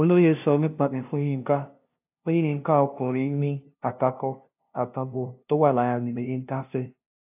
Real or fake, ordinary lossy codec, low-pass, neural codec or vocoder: fake; AAC, 32 kbps; 3.6 kHz; codec, 16 kHz, 0.5 kbps, FunCodec, trained on LibriTTS, 25 frames a second